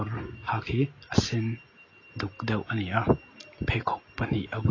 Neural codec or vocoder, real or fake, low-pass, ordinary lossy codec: none; real; 7.2 kHz; AAC, 32 kbps